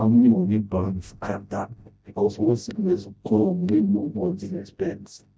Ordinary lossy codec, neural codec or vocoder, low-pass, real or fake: none; codec, 16 kHz, 0.5 kbps, FreqCodec, smaller model; none; fake